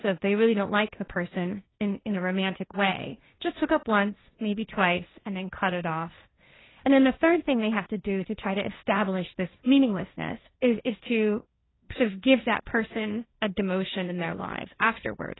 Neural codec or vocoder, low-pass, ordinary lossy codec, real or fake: codec, 16 kHz, 1.1 kbps, Voila-Tokenizer; 7.2 kHz; AAC, 16 kbps; fake